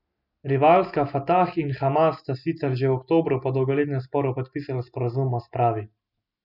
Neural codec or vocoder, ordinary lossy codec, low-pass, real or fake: none; none; 5.4 kHz; real